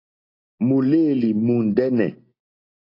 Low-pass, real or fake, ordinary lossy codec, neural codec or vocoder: 5.4 kHz; real; AAC, 32 kbps; none